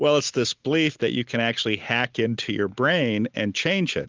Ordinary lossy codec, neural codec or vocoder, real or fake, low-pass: Opus, 24 kbps; none; real; 7.2 kHz